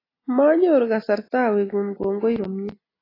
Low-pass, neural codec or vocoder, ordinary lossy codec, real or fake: 5.4 kHz; none; AAC, 24 kbps; real